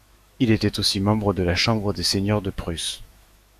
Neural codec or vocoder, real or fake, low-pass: autoencoder, 48 kHz, 128 numbers a frame, DAC-VAE, trained on Japanese speech; fake; 14.4 kHz